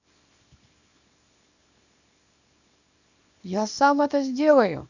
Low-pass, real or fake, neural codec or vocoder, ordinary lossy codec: 7.2 kHz; fake; codec, 24 kHz, 0.9 kbps, WavTokenizer, small release; none